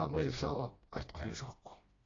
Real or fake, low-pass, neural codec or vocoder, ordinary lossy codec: fake; 7.2 kHz; codec, 16 kHz, 1 kbps, FreqCodec, smaller model; AAC, 32 kbps